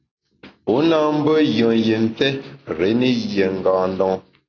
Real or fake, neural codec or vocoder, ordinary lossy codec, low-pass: real; none; AAC, 32 kbps; 7.2 kHz